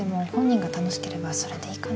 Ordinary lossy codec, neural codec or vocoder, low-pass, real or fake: none; none; none; real